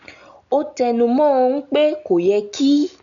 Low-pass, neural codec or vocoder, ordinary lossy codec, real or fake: 7.2 kHz; none; none; real